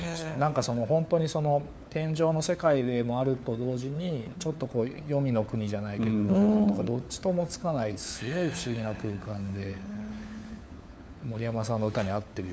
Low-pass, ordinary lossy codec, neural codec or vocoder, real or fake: none; none; codec, 16 kHz, 4 kbps, FunCodec, trained on LibriTTS, 50 frames a second; fake